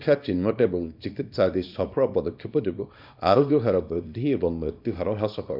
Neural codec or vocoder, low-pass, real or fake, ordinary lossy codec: codec, 24 kHz, 0.9 kbps, WavTokenizer, small release; 5.4 kHz; fake; none